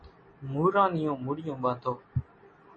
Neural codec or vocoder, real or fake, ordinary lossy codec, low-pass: none; real; MP3, 32 kbps; 9.9 kHz